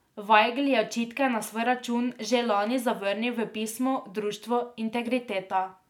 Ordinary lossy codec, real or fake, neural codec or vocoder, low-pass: none; real; none; 19.8 kHz